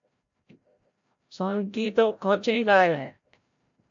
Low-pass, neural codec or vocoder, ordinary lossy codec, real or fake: 7.2 kHz; codec, 16 kHz, 0.5 kbps, FreqCodec, larger model; AAC, 64 kbps; fake